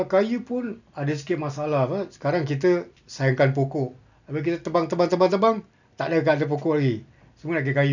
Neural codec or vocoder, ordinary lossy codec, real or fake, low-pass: none; none; real; 7.2 kHz